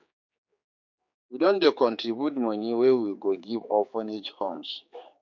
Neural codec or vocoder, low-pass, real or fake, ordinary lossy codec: codec, 16 kHz, 4 kbps, X-Codec, HuBERT features, trained on general audio; 7.2 kHz; fake; MP3, 48 kbps